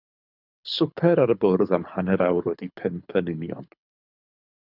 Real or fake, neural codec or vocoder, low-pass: fake; codec, 24 kHz, 6 kbps, HILCodec; 5.4 kHz